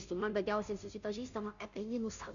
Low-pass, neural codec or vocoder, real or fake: 7.2 kHz; codec, 16 kHz, 0.5 kbps, FunCodec, trained on Chinese and English, 25 frames a second; fake